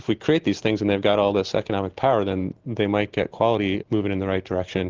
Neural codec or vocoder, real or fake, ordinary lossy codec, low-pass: none; real; Opus, 16 kbps; 7.2 kHz